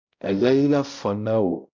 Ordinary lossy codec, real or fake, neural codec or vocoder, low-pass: MP3, 64 kbps; fake; codec, 16 kHz, 0.5 kbps, X-Codec, HuBERT features, trained on balanced general audio; 7.2 kHz